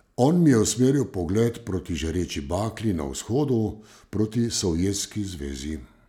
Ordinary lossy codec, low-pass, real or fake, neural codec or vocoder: none; 19.8 kHz; real; none